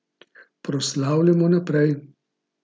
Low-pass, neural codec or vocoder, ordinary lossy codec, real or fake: none; none; none; real